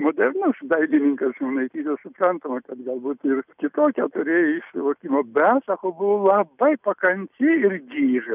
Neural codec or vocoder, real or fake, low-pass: none; real; 3.6 kHz